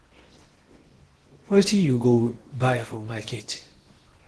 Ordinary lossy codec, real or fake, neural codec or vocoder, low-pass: Opus, 16 kbps; fake; codec, 16 kHz in and 24 kHz out, 0.8 kbps, FocalCodec, streaming, 65536 codes; 10.8 kHz